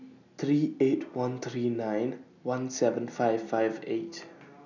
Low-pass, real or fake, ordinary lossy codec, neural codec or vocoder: 7.2 kHz; real; none; none